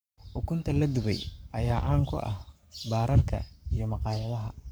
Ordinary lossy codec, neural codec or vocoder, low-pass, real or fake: none; codec, 44.1 kHz, 7.8 kbps, Pupu-Codec; none; fake